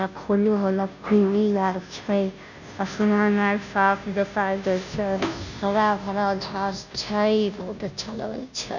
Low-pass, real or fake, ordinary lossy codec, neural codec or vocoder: 7.2 kHz; fake; none; codec, 16 kHz, 0.5 kbps, FunCodec, trained on Chinese and English, 25 frames a second